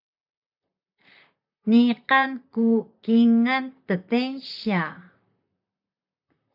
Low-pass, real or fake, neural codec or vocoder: 5.4 kHz; fake; vocoder, 44.1 kHz, 128 mel bands, Pupu-Vocoder